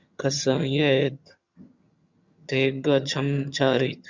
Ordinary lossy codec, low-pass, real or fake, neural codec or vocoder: Opus, 64 kbps; 7.2 kHz; fake; vocoder, 22.05 kHz, 80 mel bands, HiFi-GAN